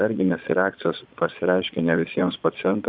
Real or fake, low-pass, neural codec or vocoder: fake; 5.4 kHz; vocoder, 22.05 kHz, 80 mel bands, Vocos